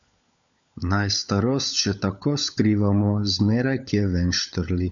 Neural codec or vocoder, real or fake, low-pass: codec, 16 kHz, 16 kbps, FunCodec, trained on LibriTTS, 50 frames a second; fake; 7.2 kHz